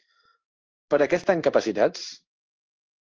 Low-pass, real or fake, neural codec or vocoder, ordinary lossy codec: 7.2 kHz; fake; codec, 16 kHz in and 24 kHz out, 1 kbps, XY-Tokenizer; Opus, 24 kbps